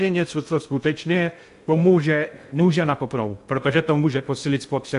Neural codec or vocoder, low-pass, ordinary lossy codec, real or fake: codec, 16 kHz in and 24 kHz out, 0.6 kbps, FocalCodec, streaming, 2048 codes; 10.8 kHz; AAC, 64 kbps; fake